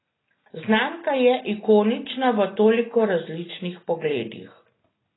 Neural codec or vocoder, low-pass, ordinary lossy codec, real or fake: none; 7.2 kHz; AAC, 16 kbps; real